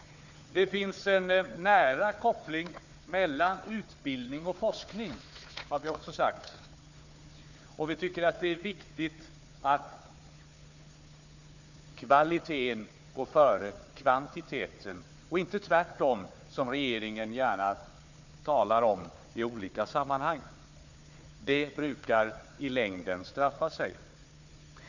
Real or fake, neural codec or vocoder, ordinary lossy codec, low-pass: fake; codec, 16 kHz, 4 kbps, FunCodec, trained on Chinese and English, 50 frames a second; none; 7.2 kHz